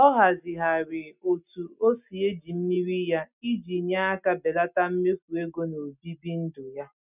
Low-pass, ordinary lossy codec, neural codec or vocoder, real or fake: 3.6 kHz; none; none; real